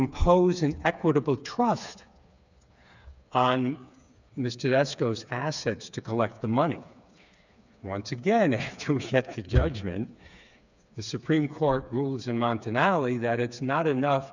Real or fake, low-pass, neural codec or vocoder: fake; 7.2 kHz; codec, 16 kHz, 4 kbps, FreqCodec, smaller model